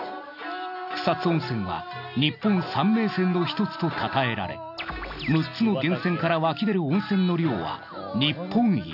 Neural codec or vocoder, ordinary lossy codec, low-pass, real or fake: none; none; 5.4 kHz; real